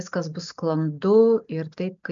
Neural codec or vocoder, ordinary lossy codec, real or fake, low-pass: none; MP3, 64 kbps; real; 7.2 kHz